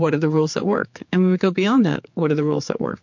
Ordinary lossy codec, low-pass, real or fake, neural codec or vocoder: MP3, 48 kbps; 7.2 kHz; fake; codec, 16 kHz, 4 kbps, X-Codec, HuBERT features, trained on general audio